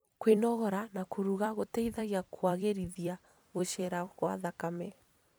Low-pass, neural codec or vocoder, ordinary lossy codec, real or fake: none; vocoder, 44.1 kHz, 128 mel bands every 256 samples, BigVGAN v2; none; fake